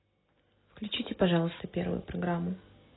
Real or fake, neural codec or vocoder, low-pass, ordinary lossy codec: real; none; 7.2 kHz; AAC, 16 kbps